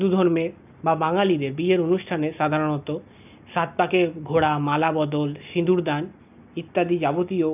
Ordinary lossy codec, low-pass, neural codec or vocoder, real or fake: none; 3.6 kHz; none; real